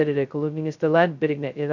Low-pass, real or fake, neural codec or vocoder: 7.2 kHz; fake; codec, 16 kHz, 0.2 kbps, FocalCodec